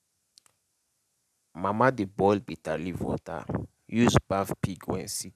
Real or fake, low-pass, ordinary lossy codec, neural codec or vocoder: real; 14.4 kHz; none; none